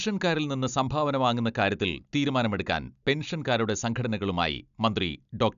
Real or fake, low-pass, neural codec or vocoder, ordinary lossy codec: real; 7.2 kHz; none; none